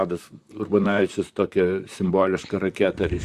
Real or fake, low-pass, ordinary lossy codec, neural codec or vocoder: fake; 14.4 kHz; Opus, 64 kbps; vocoder, 44.1 kHz, 128 mel bands, Pupu-Vocoder